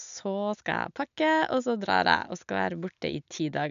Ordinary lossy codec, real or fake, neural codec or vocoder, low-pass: none; real; none; 7.2 kHz